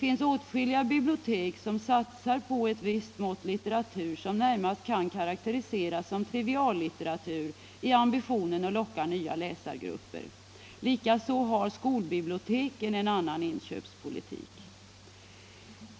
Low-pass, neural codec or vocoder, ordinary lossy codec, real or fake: none; none; none; real